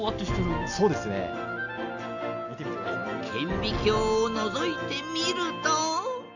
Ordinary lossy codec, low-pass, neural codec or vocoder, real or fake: none; 7.2 kHz; none; real